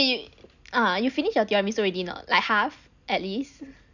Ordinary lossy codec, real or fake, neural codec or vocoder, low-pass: none; real; none; 7.2 kHz